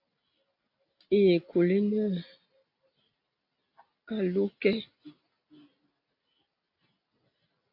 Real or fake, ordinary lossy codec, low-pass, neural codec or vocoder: real; AAC, 32 kbps; 5.4 kHz; none